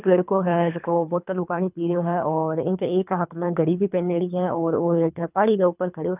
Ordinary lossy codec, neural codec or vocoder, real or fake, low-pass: none; codec, 24 kHz, 3 kbps, HILCodec; fake; 3.6 kHz